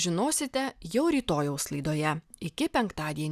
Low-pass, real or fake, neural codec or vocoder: 14.4 kHz; real; none